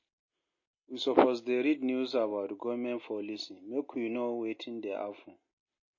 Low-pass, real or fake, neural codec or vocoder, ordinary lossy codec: 7.2 kHz; real; none; MP3, 32 kbps